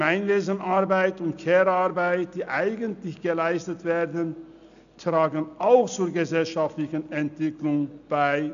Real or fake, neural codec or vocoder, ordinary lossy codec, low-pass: real; none; none; 7.2 kHz